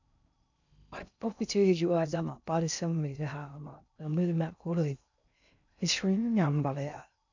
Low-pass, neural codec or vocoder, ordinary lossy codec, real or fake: 7.2 kHz; codec, 16 kHz in and 24 kHz out, 0.6 kbps, FocalCodec, streaming, 4096 codes; none; fake